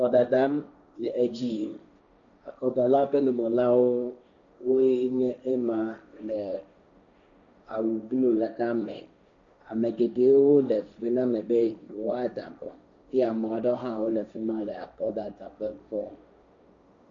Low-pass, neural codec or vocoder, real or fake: 7.2 kHz; codec, 16 kHz, 1.1 kbps, Voila-Tokenizer; fake